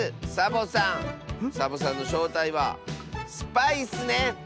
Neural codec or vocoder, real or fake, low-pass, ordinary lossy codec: none; real; none; none